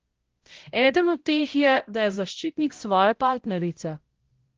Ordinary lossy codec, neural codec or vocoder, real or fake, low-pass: Opus, 16 kbps; codec, 16 kHz, 0.5 kbps, X-Codec, HuBERT features, trained on balanced general audio; fake; 7.2 kHz